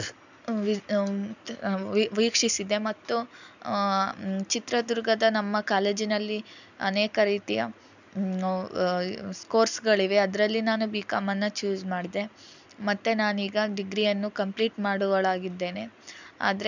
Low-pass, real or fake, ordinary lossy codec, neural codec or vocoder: 7.2 kHz; real; none; none